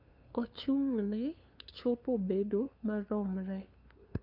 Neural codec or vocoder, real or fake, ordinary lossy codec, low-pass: codec, 16 kHz, 2 kbps, FunCodec, trained on LibriTTS, 25 frames a second; fake; none; 5.4 kHz